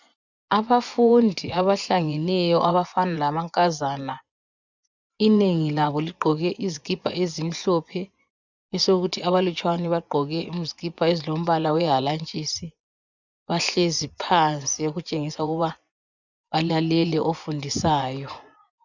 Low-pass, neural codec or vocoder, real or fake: 7.2 kHz; vocoder, 24 kHz, 100 mel bands, Vocos; fake